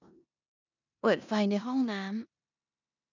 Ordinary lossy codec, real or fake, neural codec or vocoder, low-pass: none; fake; codec, 16 kHz in and 24 kHz out, 0.9 kbps, LongCat-Audio-Codec, four codebook decoder; 7.2 kHz